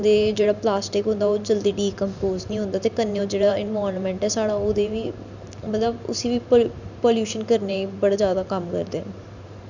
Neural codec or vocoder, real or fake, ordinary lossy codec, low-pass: vocoder, 44.1 kHz, 128 mel bands every 512 samples, BigVGAN v2; fake; none; 7.2 kHz